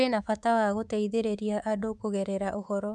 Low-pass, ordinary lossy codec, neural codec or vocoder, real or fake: none; none; codec, 24 kHz, 3.1 kbps, DualCodec; fake